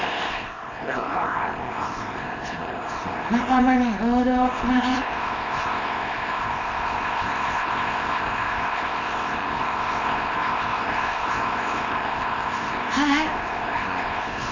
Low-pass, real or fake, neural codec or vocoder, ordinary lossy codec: 7.2 kHz; fake; codec, 24 kHz, 0.9 kbps, WavTokenizer, small release; none